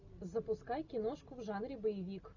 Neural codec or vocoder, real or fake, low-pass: none; real; 7.2 kHz